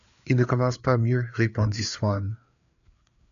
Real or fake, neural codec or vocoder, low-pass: fake; codec, 16 kHz, 4 kbps, FreqCodec, larger model; 7.2 kHz